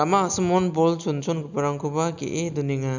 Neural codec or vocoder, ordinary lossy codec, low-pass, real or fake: none; none; 7.2 kHz; real